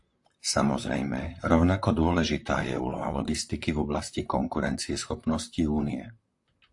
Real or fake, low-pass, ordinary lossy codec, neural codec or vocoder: fake; 10.8 kHz; AAC, 64 kbps; vocoder, 44.1 kHz, 128 mel bands, Pupu-Vocoder